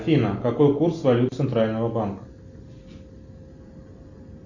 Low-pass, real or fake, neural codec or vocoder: 7.2 kHz; real; none